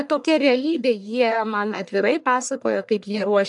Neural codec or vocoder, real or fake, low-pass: codec, 44.1 kHz, 1.7 kbps, Pupu-Codec; fake; 10.8 kHz